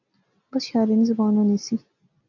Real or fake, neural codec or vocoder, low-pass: real; none; 7.2 kHz